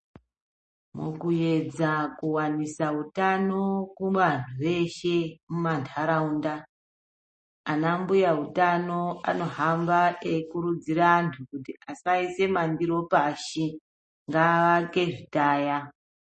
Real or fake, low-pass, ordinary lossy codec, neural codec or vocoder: real; 10.8 kHz; MP3, 32 kbps; none